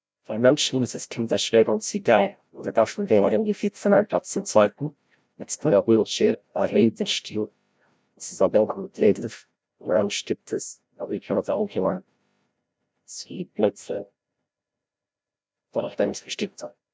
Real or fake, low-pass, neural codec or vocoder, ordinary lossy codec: fake; none; codec, 16 kHz, 0.5 kbps, FreqCodec, larger model; none